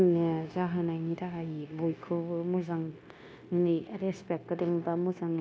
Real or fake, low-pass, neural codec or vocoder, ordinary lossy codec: fake; none; codec, 16 kHz, 0.9 kbps, LongCat-Audio-Codec; none